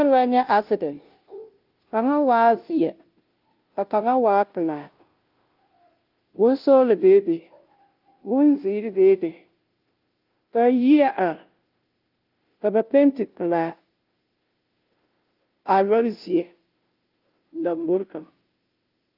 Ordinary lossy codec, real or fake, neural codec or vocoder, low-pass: Opus, 32 kbps; fake; codec, 16 kHz, 0.5 kbps, FunCodec, trained on Chinese and English, 25 frames a second; 5.4 kHz